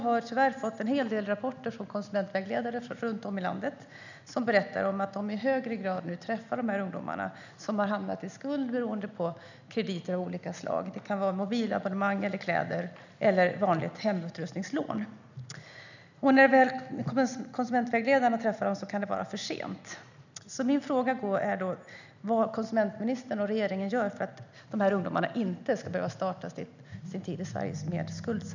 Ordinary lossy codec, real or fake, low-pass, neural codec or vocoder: none; real; 7.2 kHz; none